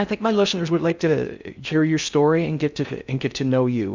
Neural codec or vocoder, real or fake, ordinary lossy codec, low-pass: codec, 16 kHz in and 24 kHz out, 0.6 kbps, FocalCodec, streaming, 2048 codes; fake; Opus, 64 kbps; 7.2 kHz